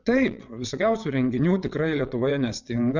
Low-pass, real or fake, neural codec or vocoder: 7.2 kHz; fake; vocoder, 22.05 kHz, 80 mel bands, WaveNeXt